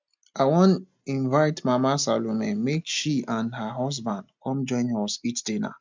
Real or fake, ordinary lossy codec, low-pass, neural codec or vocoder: real; MP3, 64 kbps; 7.2 kHz; none